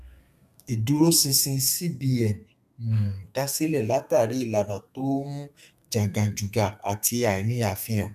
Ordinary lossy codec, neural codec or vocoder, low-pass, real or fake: none; codec, 32 kHz, 1.9 kbps, SNAC; 14.4 kHz; fake